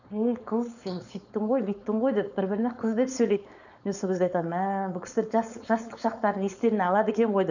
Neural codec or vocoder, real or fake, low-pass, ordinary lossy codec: codec, 16 kHz, 4.8 kbps, FACodec; fake; 7.2 kHz; none